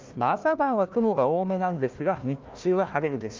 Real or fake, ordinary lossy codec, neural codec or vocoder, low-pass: fake; Opus, 24 kbps; codec, 16 kHz, 1 kbps, FunCodec, trained on Chinese and English, 50 frames a second; 7.2 kHz